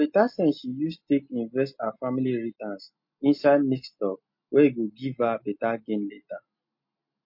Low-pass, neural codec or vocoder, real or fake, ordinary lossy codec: 5.4 kHz; none; real; MP3, 32 kbps